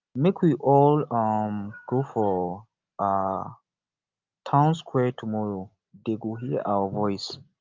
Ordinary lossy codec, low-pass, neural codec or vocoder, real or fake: Opus, 24 kbps; 7.2 kHz; none; real